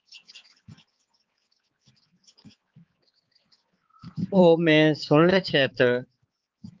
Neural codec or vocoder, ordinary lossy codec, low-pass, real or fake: codec, 16 kHz, 4 kbps, X-Codec, HuBERT features, trained on balanced general audio; Opus, 32 kbps; 7.2 kHz; fake